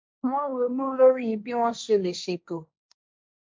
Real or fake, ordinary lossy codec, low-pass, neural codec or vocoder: fake; none; none; codec, 16 kHz, 1.1 kbps, Voila-Tokenizer